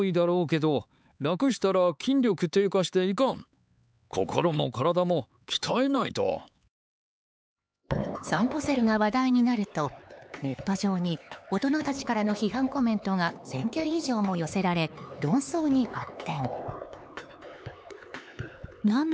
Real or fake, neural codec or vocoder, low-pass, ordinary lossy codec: fake; codec, 16 kHz, 4 kbps, X-Codec, HuBERT features, trained on LibriSpeech; none; none